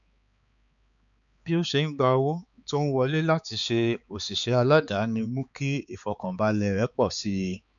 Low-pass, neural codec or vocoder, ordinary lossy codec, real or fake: 7.2 kHz; codec, 16 kHz, 4 kbps, X-Codec, HuBERT features, trained on balanced general audio; none; fake